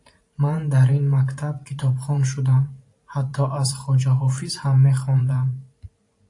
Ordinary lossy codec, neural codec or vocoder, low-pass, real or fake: AAC, 48 kbps; vocoder, 44.1 kHz, 128 mel bands every 512 samples, BigVGAN v2; 10.8 kHz; fake